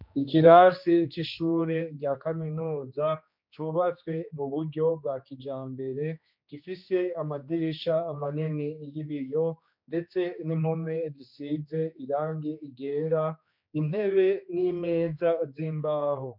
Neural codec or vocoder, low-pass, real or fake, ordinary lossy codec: codec, 16 kHz, 2 kbps, X-Codec, HuBERT features, trained on general audio; 5.4 kHz; fake; MP3, 48 kbps